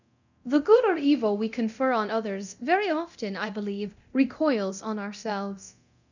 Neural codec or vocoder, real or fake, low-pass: codec, 24 kHz, 0.9 kbps, DualCodec; fake; 7.2 kHz